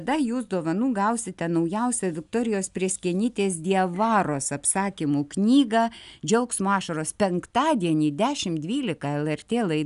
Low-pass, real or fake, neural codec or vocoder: 10.8 kHz; real; none